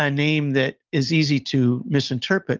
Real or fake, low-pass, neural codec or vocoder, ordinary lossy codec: real; 7.2 kHz; none; Opus, 24 kbps